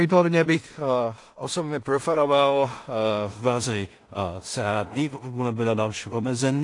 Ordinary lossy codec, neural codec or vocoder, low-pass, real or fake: AAC, 48 kbps; codec, 16 kHz in and 24 kHz out, 0.4 kbps, LongCat-Audio-Codec, two codebook decoder; 10.8 kHz; fake